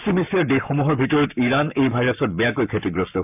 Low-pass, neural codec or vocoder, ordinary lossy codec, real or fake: 3.6 kHz; codec, 44.1 kHz, 7.8 kbps, Pupu-Codec; none; fake